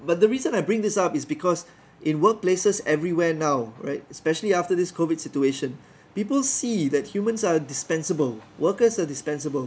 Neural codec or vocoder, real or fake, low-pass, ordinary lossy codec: none; real; none; none